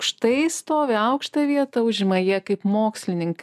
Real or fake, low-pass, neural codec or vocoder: real; 14.4 kHz; none